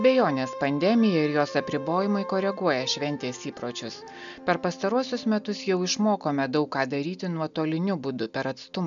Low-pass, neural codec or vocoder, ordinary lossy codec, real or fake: 7.2 kHz; none; MP3, 96 kbps; real